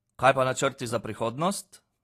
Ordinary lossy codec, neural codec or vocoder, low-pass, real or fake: AAC, 48 kbps; none; 14.4 kHz; real